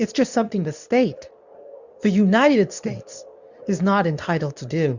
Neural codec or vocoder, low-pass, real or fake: codec, 24 kHz, 0.9 kbps, WavTokenizer, medium speech release version 2; 7.2 kHz; fake